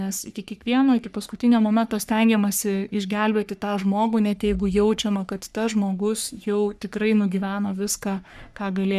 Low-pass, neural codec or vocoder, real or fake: 14.4 kHz; codec, 44.1 kHz, 3.4 kbps, Pupu-Codec; fake